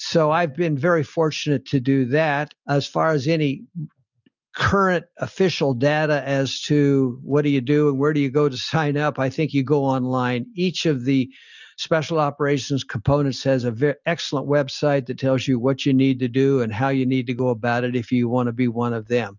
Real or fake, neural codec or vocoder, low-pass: real; none; 7.2 kHz